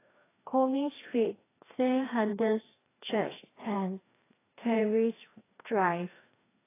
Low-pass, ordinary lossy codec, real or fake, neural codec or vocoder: 3.6 kHz; AAC, 16 kbps; fake; codec, 16 kHz, 1 kbps, FreqCodec, larger model